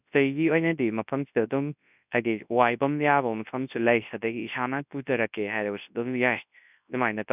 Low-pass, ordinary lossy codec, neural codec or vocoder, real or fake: 3.6 kHz; none; codec, 24 kHz, 0.9 kbps, WavTokenizer, large speech release; fake